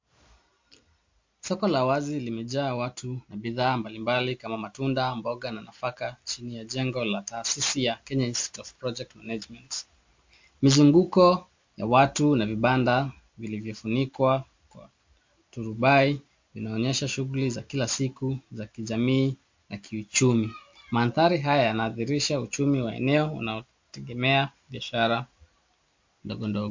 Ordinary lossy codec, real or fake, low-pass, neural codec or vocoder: MP3, 48 kbps; real; 7.2 kHz; none